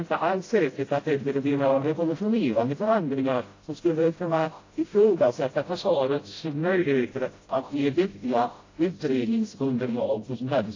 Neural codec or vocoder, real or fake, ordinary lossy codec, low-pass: codec, 16 kHz, 0.5 kbps, FreqCodec, smaller model; fake; AAC, 32 kbps; 7.2 kHz